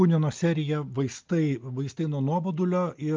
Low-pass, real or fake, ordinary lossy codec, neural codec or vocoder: 7.2 kHz; real; Opus, 24 kbps; none